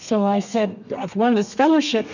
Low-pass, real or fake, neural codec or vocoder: 7.2 kHz; fake; codec, 32 kHz, 1.9 kbps, SNAC